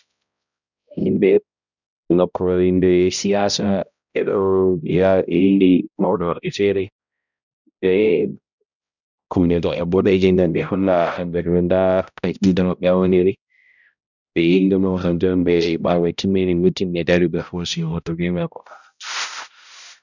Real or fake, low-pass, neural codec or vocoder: fake; 7.2 kHz; codec, 16 kHz, 0.5 kbps, X-Codec, HuBERT features, trained on balanced general audio